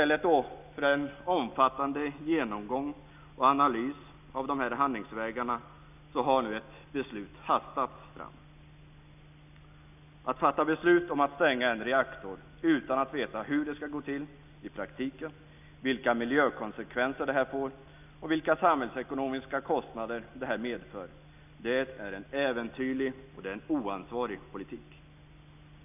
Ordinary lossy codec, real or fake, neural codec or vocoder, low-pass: none; real; none; 3.6 kHz